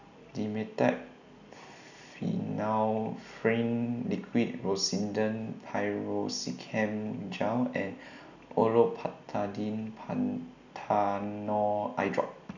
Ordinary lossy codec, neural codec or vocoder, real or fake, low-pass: none; none; real; 7.2 kHz